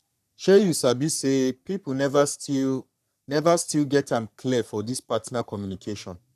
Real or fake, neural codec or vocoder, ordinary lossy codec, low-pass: fake; codec, 44.1 kHz, 3.4 kbps, Pupu-Codec; none; 14.4 kHz